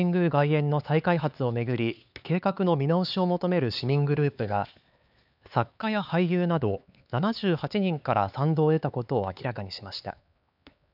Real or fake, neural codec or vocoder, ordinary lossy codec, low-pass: fake; codec, 16 kHz, 4 kbps, X-Codec, HuBERT features, trained on LibriSpeech; none; 5.4 kHz